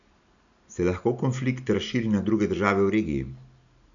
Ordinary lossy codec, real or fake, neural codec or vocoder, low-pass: none; real; none; 7.2 kHz